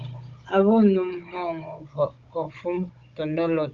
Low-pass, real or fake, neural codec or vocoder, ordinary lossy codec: 7.2 kHz; fake; codec, 16 kHz, 16 kbps, FunCodec, trained on Chinese and English, 50 frames a second; Opus, 24 kbps